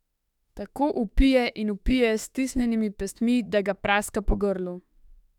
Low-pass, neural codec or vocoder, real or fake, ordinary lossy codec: 19.8 kHz; autoencoder, 48 kHz, 32 numbers a frame, DAC-VAE, trained on Japanese speech; fake; none